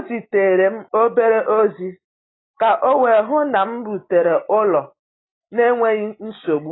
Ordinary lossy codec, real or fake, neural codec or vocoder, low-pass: AAC, 16 kbps; real; none; 7.2 kHz